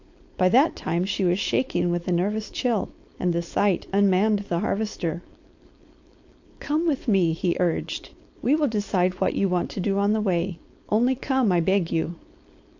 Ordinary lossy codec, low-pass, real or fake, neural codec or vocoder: AAC, 48 kbps; 7.2 kHz; fake; codec, 16 kHz, 4.8 kbps, FACodec